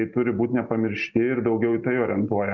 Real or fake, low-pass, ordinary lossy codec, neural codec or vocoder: real; 7.2 kHz; Opus, 64 kbps; none